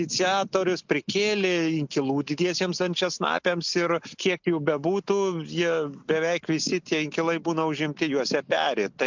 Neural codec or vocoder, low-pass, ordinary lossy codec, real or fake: none; 7.2 kHz; MP3, 64 kbps; real